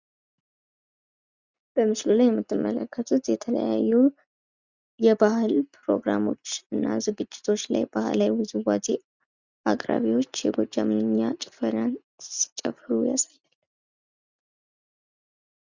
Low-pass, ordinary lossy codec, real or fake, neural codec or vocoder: 7.2 kHz; Opus, 64 kbps; real; none